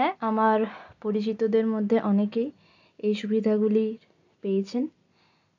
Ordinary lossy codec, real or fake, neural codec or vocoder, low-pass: AAC, 48 kbps; real; none; 7.2 kHz